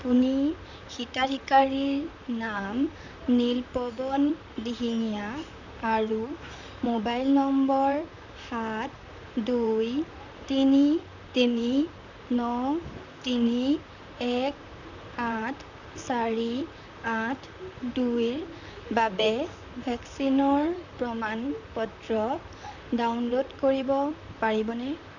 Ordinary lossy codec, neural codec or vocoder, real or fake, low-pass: none; vocoder, 44.1 kHz, 128 mel bands, Pupu-Vocoder; fake; 7.2 kHz